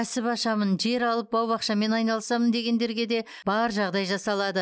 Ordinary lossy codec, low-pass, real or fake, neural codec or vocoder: none; none; real; none